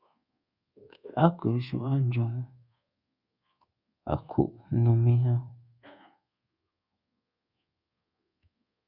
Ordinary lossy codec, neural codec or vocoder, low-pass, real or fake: Opus, 64 kbps; codec, 24 kHz, 1.2 kbps, DualCodec; 5.4 kHz; fake